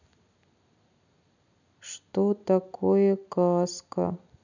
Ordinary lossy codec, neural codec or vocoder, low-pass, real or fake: none; none; 7.2 kHz; real